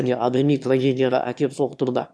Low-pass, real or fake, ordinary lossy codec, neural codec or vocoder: none; fake; none; autoencoder, 22.05 kHz, a latent of 192 numbers a frame, VITS, trained on one speaker